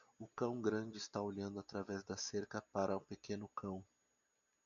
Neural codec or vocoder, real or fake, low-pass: none; real; 7.2 kHz